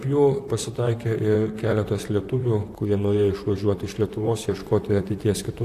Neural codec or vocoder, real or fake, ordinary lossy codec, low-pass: vocoder, 44.1 kHz, 128 mel bands, Pupu-Vocoder; fake; AAC, 64 kbps; 14.4 kHz